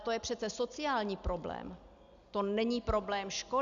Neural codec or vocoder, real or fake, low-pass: none; real; 7.2 kHz